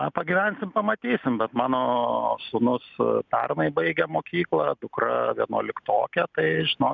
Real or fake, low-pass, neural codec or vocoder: real; 7.2 kHz; none